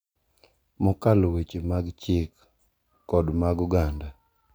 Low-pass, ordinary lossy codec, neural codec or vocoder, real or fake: none; none; none; real